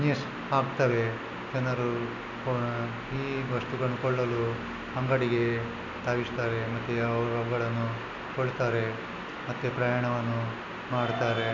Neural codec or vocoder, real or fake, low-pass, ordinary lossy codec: none; real; 7.2 kHz; none